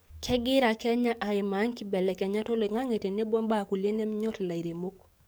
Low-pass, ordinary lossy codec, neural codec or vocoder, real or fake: none; none; codec, 44.1 kHz, 7.8 kbps, DAC; fake